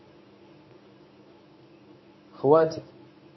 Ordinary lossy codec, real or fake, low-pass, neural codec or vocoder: MP3, 24 kbps; fake; 7.2 kHz; codec, 24 kHz, 0.9 kbps, WavTokenizer, medium speech release version 1